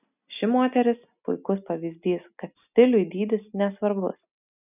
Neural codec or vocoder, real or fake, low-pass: none; real; 3.6 kHz